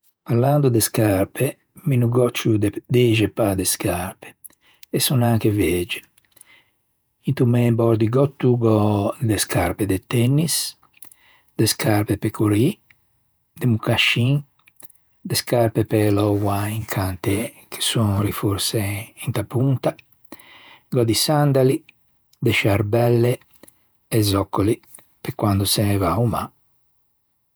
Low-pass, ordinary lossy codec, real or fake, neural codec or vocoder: none; none; fake; vocoder, 48 kHz, 128 mel bands, Vocos